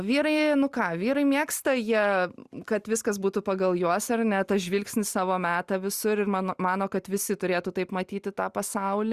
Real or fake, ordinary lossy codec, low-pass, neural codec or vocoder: real; Opus, 64 kbps; 14.4 kHz; none